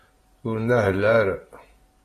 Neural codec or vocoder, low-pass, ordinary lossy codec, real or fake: vocoder, 48 kHz, 128 mel bands, Vocos; 14.4 kHz; AAC, 48 kbps; fake